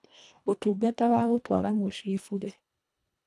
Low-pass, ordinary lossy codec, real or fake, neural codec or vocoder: none; none; fake; codec, 24 kHz, 1.5 kbps, HILCodec